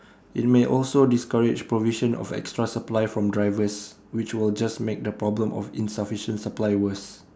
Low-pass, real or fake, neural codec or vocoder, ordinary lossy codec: none; real; none; none